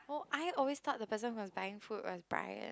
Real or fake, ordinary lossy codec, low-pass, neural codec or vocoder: real; none; none; none